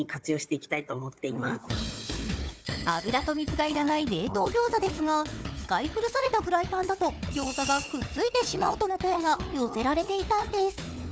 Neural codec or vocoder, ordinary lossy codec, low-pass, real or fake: codec, 16 kHz, 4 kbps, FunCodec, trained on Chinese and English, 50 frames a second; none; none; fake